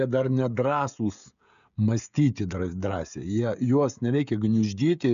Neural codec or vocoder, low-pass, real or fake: codec, 16 kHz, 16 kbps, FreqCodec, smaller model; 7.2 kHz; fake